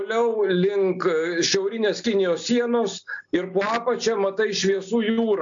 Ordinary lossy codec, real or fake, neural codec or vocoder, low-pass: AAC, 64 kbps; real; none; 7.2 kHz